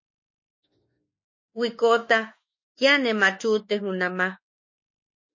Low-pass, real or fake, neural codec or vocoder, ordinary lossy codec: 7.2 kHz; fake; autoencoder, 48 kHz, 32 numbers a frame, DAC-VAE, trained on Japanese speech; MP3, 32 kbps